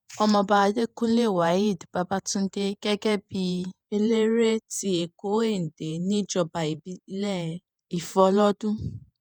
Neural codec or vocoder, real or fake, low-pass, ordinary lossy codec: vocoder, 48 kHz, 128 mel bands, Vocos; fake; none; none